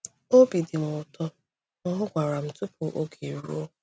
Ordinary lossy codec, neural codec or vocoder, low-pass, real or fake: none; none; none; real